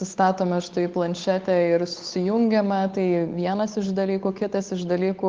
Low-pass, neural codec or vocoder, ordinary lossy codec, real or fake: 7.2 kHz; codec, 16 kHz, 8 kbps, FunCodec, trained on Chinese and English, 25 frames a second; Opus, 32 kbps; fake